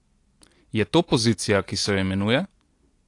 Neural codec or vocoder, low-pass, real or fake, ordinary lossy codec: none; 10.8 kHz; real; AAC, 48 kbps